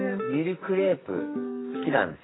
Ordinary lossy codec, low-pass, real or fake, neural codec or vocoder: AAC, 16 kbps; 7.2 kHz; fake; codec, 44.1 kHz, 2.6 kbps, SNAC